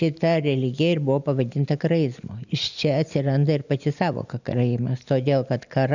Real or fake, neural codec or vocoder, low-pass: real; none; 7.2 kHz